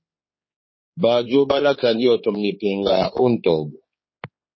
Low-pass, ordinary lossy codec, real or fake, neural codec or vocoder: 7.2 kHz; MP3, 24 kbps; fake; codec, 16 kHz, 4 kbps, X-Codec, HuBERT features, trained on general audio